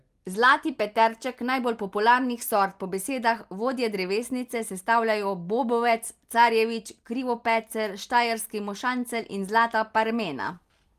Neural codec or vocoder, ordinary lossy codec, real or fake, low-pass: none; Opus, 24 kbps; real; 14.4 kHz